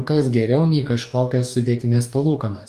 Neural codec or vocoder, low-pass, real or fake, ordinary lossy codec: codec, 44.1 kHz, 2.6 kbps, DAC; 14.4 kHz; fake; Opus, 32 kbps